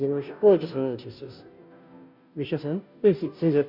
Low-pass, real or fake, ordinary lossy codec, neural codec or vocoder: 5.4 kHz; fake; none; codec, 16 kHz, 0.5 kbps, FunCodec, trained on Chinese and English, 25 frames a second